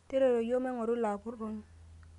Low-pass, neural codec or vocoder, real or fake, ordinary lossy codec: 10.8 kHz; none; real; none